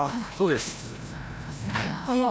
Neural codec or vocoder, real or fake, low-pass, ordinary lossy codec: codec, 16 kHz, 0.5 kbps, FreqCodec, larger model; fake; none; none